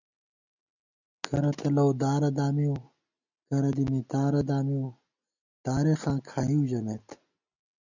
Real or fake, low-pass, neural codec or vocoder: real; 7.2 kHz; none